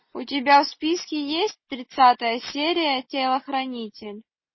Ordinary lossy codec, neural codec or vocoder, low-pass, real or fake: MP3, 24 kbps; none; 7.2 kHz; real